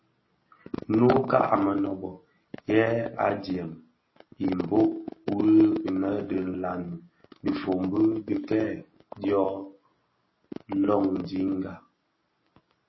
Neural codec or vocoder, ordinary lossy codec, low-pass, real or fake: none; MP3, 24 kbps; 7.2 kHz; real